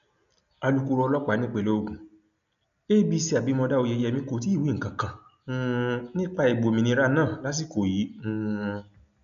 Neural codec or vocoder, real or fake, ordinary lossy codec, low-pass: none; real; none; 7.2 kHz